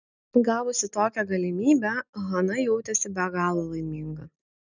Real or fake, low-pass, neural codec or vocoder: real; 7.2 kHz; none